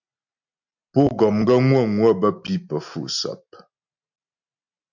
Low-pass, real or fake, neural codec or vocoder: 7.2 kHz; real; none